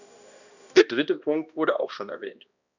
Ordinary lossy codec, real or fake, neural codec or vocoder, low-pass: none; fake; codec, 16 kHz, 1 kbps, X-Codec, HuBERT features, trained on balanced general audio; 7.2 kHz